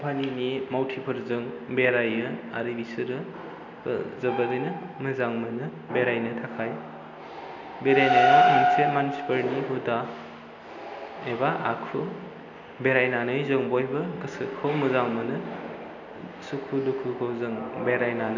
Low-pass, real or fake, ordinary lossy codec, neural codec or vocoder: 7.2 kHz; real; none; none